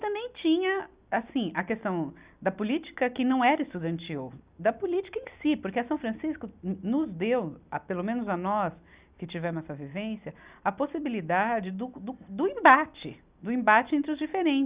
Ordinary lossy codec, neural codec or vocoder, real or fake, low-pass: Opus, 64 kbps; none; real; 3.6 kHz